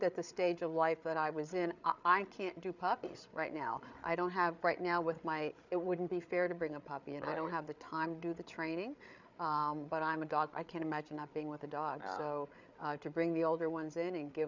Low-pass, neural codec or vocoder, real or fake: 7.2 kHz; codec, 16 kHz, 8 kbps, FreqCodec, larger model; fake